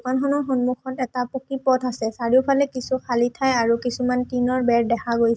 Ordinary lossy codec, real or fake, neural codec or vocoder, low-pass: none; real; none; none